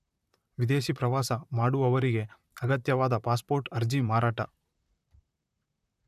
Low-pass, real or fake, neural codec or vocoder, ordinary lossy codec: 14.4 kHz; fake; vocoder, 44.1 kHz, 128 mel bands, Pupu-Vocoder; none